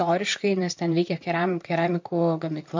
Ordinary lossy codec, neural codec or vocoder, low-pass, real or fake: MP3, 48 kbps; vocoder, 44.1 kHz, 128 mel bands, Pupu-Vocoder; 7.2 kHz; fake